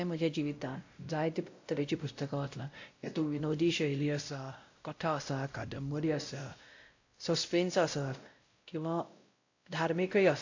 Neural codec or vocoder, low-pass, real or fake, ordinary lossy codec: codec, 16 kHz, 0.5 kbps, X-Codec, WavLM features, trained on Multilingual LibriSpeech; 7.2 kHz; fake; AAC, 48 kbps